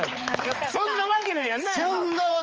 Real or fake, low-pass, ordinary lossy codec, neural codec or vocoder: fake; 7.2 kHz; Opus, 24 kbps; codec, 16 kHz, 4 kbps, X-Codec, HuBERT features, trained on balanced general audio